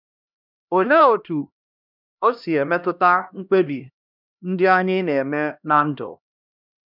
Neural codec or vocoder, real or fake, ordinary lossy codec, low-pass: codec, 16 kHz, 1 kbps, X-Codec, HuBERT features, trained on LibriSpeech; fake; none; 5.4 kHz